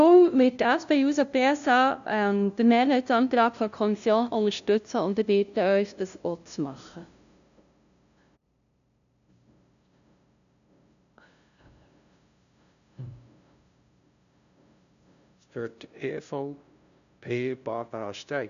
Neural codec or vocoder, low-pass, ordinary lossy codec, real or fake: codec, 16 kHz, 0.5 kbps, FunCodec, trained on LibriTTS, 25 frames a second; 7.2 kHz; none; fake